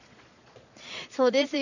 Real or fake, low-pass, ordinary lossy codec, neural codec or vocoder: fake; 7.2 kHz; none; vocoder, 22.05 kHz, 80 mel bands, Vocos